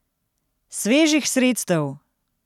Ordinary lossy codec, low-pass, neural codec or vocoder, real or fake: none; 19.8 kHz; none; real